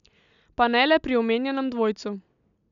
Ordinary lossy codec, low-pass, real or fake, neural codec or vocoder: none; 7.2 kHz; real; none